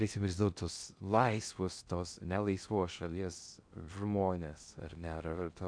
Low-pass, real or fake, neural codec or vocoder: 9.9 kHz; fake; codec, 16 kHz in and 24 kHz out, 0.6 kbps, FocalCodec, streaming, 2048 codes